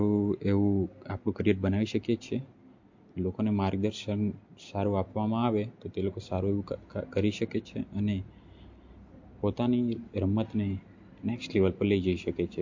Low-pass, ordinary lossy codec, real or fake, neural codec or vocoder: 7.2 kHz; MP3, 48 kbps; real; none